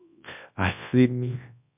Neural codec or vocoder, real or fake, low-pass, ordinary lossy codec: codec, 24 kHz, 0.9 kbps, WavTokenizer, large speech release; fake; 3.6 kHz; MP3, 32 kbps